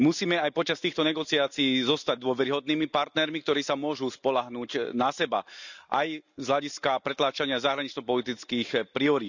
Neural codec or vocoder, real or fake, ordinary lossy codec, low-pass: none; real; none; 7.2 kHz